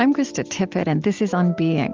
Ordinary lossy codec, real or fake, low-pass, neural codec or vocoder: Opus, 32 kbps; real; 7.2 kHz; none